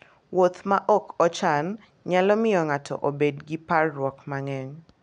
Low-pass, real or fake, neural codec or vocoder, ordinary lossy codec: 9.9 kHz; real; none; none